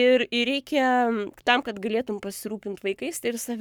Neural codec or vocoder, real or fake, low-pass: codec, 44.1 kHz, 7.8 kbps, Pupu-Codec; fake; 19.8 kHz